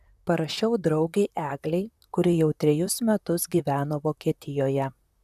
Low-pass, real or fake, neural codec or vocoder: 14.4 kHz; fake; vocoder, 44.1 kHz, 128 mel bands, Pupu-Vocoder